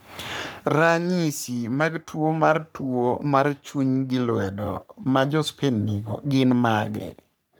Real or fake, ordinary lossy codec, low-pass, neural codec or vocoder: fake; none; none; codec, 44.1 kHz, 3.4 kbps, Pupu-Codec